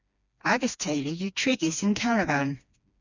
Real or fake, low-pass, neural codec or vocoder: fake; 7.2 kHz; codec, 16 kHz, 2 kbps, FreqCodec, smaller model